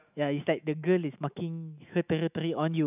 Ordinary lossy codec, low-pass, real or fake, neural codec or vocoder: none; 3.6 kHz; fake; autoencoder, 48 kHz, 128 numbers a frame, DAC-VAE, trained on Japanese speech